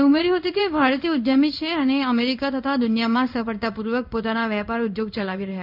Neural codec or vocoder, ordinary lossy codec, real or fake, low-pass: codec, 16 kHz in and 24 kHz out, 1 kbps, XY-Tokenizer; none; fake; 5.4 kHz